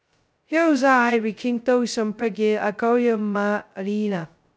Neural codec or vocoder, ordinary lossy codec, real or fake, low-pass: codec, 16 kHz, 0.2 kbps, FocalCodec; none; fake; none